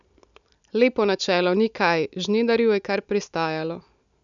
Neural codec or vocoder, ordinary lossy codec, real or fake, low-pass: none; none; real; 7.2 kHz